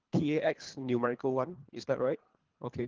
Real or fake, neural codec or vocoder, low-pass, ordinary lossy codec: fake; codec, 24 kHz, 3 kbps, HILCodec; 7.2 kHz; Opus, 16 kbps